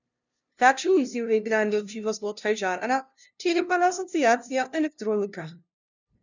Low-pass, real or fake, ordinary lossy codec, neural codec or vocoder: 7.2 kHz; fake; none; codec, 16 kHz, 0.5 kbps, FunCodec, trained on LibriTTS, 25 frames a second